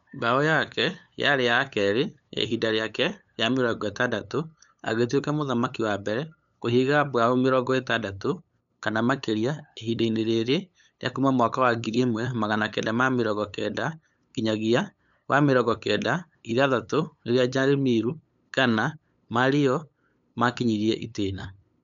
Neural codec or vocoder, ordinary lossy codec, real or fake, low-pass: codec, 16 kHz, 8 kbps, FunCodec, trained on LibriTTS, 25 frames a second; none; fake; 7.2 kHz